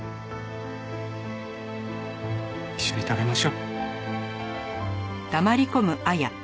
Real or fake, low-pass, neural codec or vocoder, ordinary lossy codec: real; none; none; none